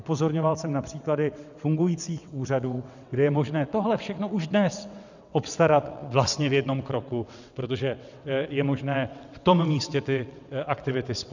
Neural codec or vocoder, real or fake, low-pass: vocoder, 22.05 kHz, 80 mel bands, WaveNeXt; fake; 7.2 kHz